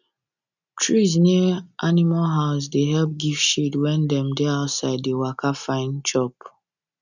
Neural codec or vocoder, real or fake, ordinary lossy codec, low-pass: none; real; none; 7.2 kHz